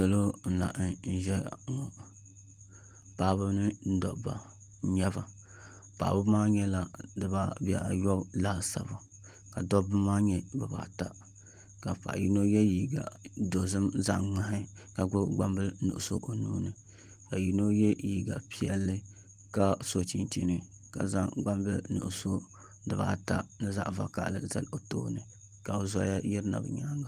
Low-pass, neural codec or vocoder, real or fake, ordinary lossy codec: 14.4 kHz; none; real; Opus, 24 kbps